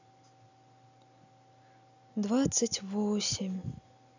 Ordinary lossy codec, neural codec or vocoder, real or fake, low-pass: none; none; real; 7.2 kHz